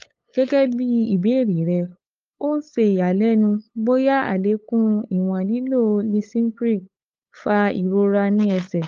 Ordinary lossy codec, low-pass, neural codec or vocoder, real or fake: Opus, 32 kbps; 7.2 kHz; codec, 16 kHz, 8 kbps, FunCodec, trained on LibriTTS, 25 frames a second; fake